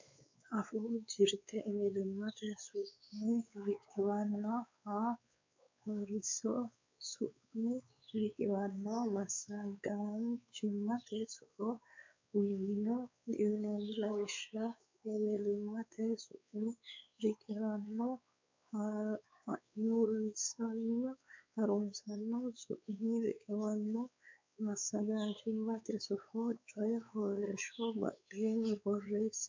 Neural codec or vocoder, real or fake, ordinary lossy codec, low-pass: codec, 16 kHz, 4 kbps, X-Codec, WavLM features, trained on Multilingual LibriSpeech; fake; MP3, 64 kbps; 7.2 kHz